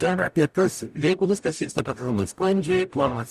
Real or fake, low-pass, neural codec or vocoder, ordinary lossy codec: fake; 14.4 kHz; codec, 44.1 kHz, 0.9 kbps, DAC; Opus, 64 kbps